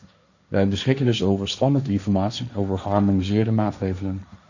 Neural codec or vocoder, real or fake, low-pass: codec, 16 kHz, 1.1 kbps, Voila-Tokenizer; fake; 7.2 kHz